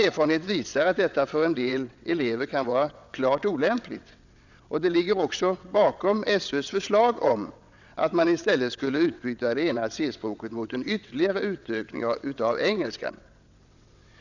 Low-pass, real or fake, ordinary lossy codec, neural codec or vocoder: 7.2 kHz; fake; none; vocoder, 22.05 kHz, 80 mel bands, WaveNeXt